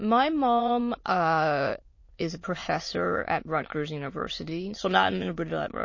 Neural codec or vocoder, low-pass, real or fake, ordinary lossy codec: autoencoder, 22.05 kHz, a latent of 192 numbers a frame, VITS, trained on many speakers; 7.2 kHz; fake; MP3, 32 kbps